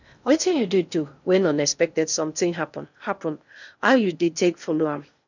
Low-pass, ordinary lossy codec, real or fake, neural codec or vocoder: 7.2 kHz; none; fake; codec, 16 kHz in and 24 kHz out, 0.6 kbps, FocalCodec, streaming, 2048 codes